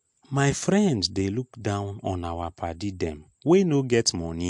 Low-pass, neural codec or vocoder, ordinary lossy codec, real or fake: 10.8 kHz; none; MP3, 64 kbps; real